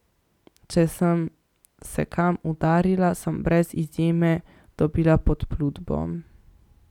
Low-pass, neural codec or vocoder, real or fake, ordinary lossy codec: 19.8 kHz; none; real; none